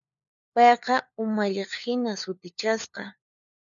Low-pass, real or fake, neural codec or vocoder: 7.2 kHz; fake; codec, 16 kHz, 4 kbps, FunCodec, trained on LibriTTS, 50 frames a second